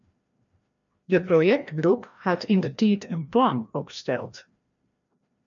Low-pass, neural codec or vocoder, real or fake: 7.2 kHz; codec, 16 kHz, 1 kbps, FreqCodec, larger model; fake